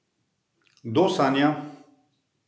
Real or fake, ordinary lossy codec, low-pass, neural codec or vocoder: real; none; none; none